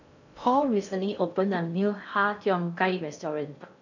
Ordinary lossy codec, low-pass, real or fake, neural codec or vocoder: none; 7.2 kHz; fake; codec, 16 kHz in and 24 kHz out, 0.6 kbps, FocalCodec, streaming, 4096 codes